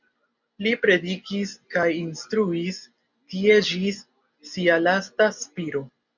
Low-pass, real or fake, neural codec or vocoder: 7.2 kHz; real; none